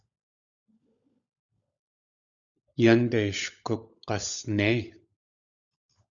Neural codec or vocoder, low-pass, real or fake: codec, 16 kHz, 16 kbps, FunCodec, trained on LibriTTS, 50 frames a second; 7.2 kHz; fake